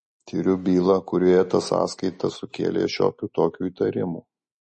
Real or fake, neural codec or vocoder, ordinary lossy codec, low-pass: real; none; MP3, 32 kbps; 10.8 kHz